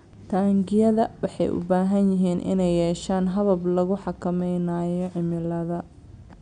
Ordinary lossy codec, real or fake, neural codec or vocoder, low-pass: none; real; none; 9.9 kHz